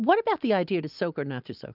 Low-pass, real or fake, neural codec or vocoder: 5.4 kHz; real; none